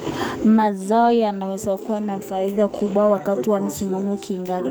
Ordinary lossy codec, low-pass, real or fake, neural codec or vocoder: none; none; fake; codec, 44.1 kHz, 2.6 kbps, SNAC